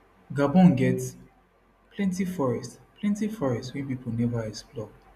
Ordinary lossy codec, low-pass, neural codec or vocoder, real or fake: none; 14.4 kHz; none; real